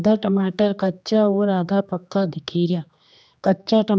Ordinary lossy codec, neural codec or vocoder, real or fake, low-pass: none; codec, 16 kHz, 2 kbps, X-Codec, HuBERT features, trained on general audio; fake; none